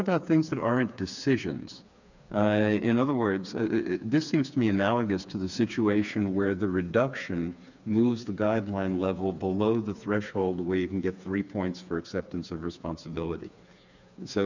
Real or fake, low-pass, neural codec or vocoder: fake; 7.2 kHz; codec, 16 kHz, 4 kbps, FreqCodec, smaller model